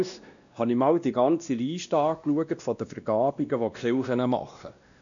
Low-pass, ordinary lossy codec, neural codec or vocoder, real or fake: 7.2 kHz; none; codec, 16 kHz, 1 kbps, X-Codec, WavLM features, trained on Multilingual LibriSpeech; fake